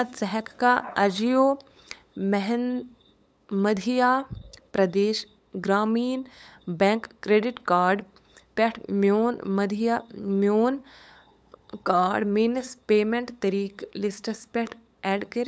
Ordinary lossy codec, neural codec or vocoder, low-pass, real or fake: none; codec, 16 kHz, 8 kbps, FunCodec, trained on LibriTTS, 25 frames a second; none; fake